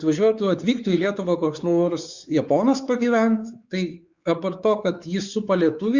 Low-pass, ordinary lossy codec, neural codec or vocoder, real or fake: 7.2 kHz; Opus, 64 kbps; codec, 16 kHz, 4 kbps, X-Codec, WavLM features, trained on Multilingual LibriSpeech; fake